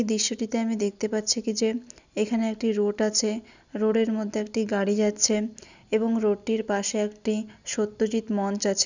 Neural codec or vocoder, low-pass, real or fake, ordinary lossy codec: none; 7.2 kHz; real; none